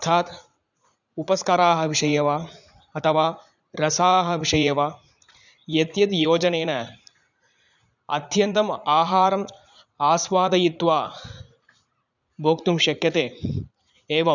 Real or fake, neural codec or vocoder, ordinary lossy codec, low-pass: fake; vocoder, 44.1 kHz, 80 mel bands, Vocos; none; 7.2 kHz